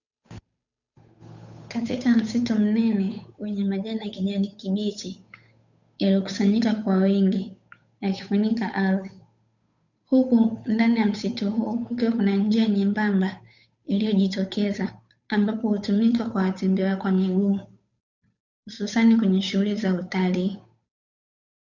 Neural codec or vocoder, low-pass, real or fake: codec, 16 kHz, 8 kbps, FunCodec, trained on Chinese and English, 25 frames a second; 7.2 kHz; fake